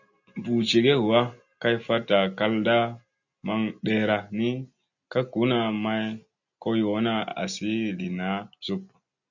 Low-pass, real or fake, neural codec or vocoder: 7.2 kHz; real; none